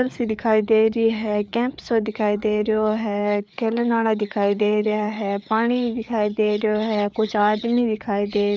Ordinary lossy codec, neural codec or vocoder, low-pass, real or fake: none; codec, 16 kHz, 4 kbps, FreqCodec, larger model; none; fake